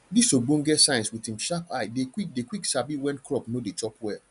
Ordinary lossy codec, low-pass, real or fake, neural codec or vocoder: none; 10.8 kHz; real; none